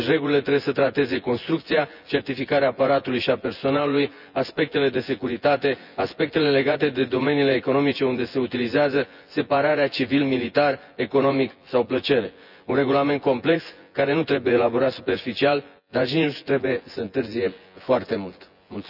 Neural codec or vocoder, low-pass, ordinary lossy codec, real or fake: vocoder, 24 kHz, 100 mel bands, Vocos; 5.4 kHz; none; fake